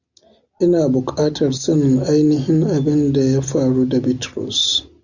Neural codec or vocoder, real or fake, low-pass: none; real; 7.2 kHz